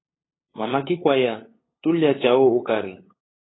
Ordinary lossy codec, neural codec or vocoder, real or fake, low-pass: AAC, 16 kbps; codec, 16 kHz, 8 kbps, FunCodec, trained on LibriTTS, 25 frames a second; fake; 7.2 kHz